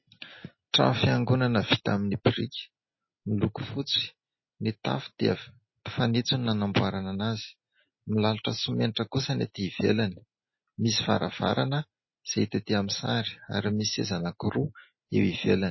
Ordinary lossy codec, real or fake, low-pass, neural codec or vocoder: MP3, 24 kbps; real; 7.2 kHz; none